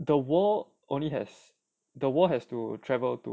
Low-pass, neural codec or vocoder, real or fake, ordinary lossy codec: none; none; real; none